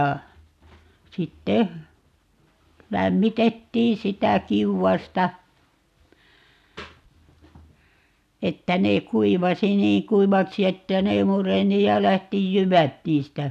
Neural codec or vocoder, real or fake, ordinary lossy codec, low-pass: none; real; none; 14.4 kHz